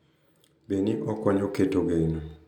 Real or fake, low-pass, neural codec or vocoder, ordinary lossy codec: real; 19.8 kHz; none; none